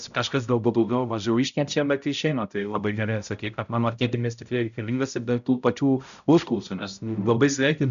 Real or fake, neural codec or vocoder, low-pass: fake; codec, 16 kHz, 0.5 kbps, X-Codec, HuBERT features, trained on balanced general audio; 7.2 kHz